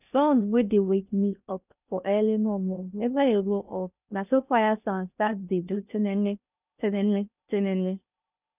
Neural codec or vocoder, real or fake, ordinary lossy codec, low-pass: codec, 16 kHz in and 24 kHz out, 0.6 kbps, FocalCodec, streaming, 4096 codes; fake; none; 3.6 kHz